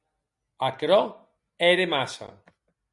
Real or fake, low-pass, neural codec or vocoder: real; 10.8 kHz; none